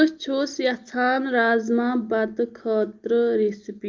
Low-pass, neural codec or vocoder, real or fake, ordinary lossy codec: 7.2 kHz; none; real; Opus, 24 kbps